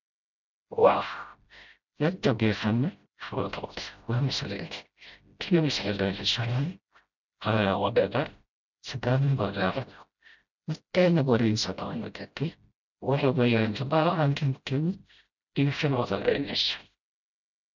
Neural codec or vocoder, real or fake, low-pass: codec, 16 kHz, 0.5 kbps, FreqCodec, smaller model; fake; 7.2 kHz